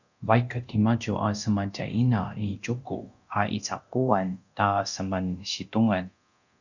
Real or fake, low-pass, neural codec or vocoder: fake; 7.2 kHz; codec, 24 kHz, 0.9 kbps, DualCodec